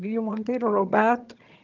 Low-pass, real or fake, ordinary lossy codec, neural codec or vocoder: 7.2 kHz; fake; Opus, 32 kbps; vocoder, 22.05 kHz, 80 mel bands, HiFi-GAN